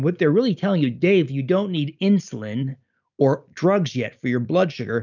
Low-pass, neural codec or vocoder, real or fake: 7.2 kHz; none; real